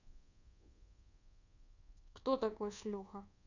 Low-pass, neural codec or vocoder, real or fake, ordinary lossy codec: 7.2 kHz; codec, 24 kHz, 1.2 kbps, DualCodec; fake; none